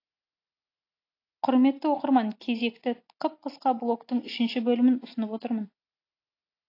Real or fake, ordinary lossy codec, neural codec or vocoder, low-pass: real; AAC, 32 kbps; none; 5.4 kHz